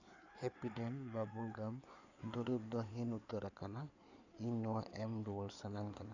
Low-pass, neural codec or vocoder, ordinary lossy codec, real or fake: 7.2 kHz; codec, 16 kHz in and 24 kHz out, 2.2 kbps, FireRedTTS-2 codec; none; fake